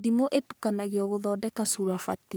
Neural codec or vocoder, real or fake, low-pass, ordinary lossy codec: codec, 44.1 kHz, 3.4 kbps, Pupu-Codec; fake; none; none